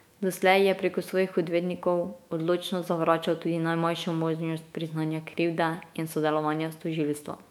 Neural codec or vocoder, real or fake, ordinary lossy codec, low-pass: autoencoder, 48 kHz, 128 numbers a frame, DAC-VAE, trained on Japanese speech; fake; MP3, 96 kbps; 19.8 kHz